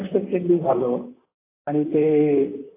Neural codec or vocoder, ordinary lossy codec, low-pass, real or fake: vocoder, 44.1 kHz, 128 mel bands, Pupu-Vocoder; AAC, 16 kbps; 3.6 kHz; fake